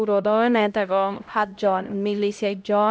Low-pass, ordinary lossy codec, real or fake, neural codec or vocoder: none; none; fake; codec, 16 kHz, 0.5 kbps, X-Codec, HuBERT features, trained on LibriSpeech